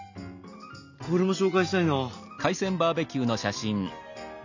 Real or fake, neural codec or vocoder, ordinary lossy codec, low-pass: real; none; none; 7.2 kHz